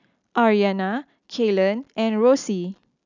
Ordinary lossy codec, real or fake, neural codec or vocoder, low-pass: none; real; none; 7.2 kHz